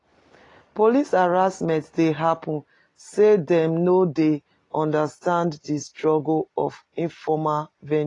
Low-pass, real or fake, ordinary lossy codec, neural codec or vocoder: 10.8 kHz; real; AAC, 32 kbps; none